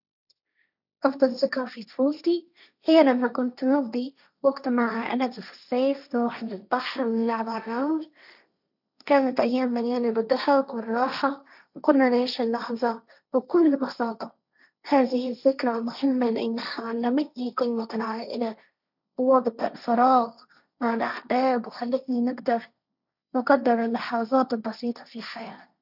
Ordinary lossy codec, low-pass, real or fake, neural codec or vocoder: none; 5.4 kHz; fake; codec, 16 kHz, 1.1 kbps, Voila-Tokenizer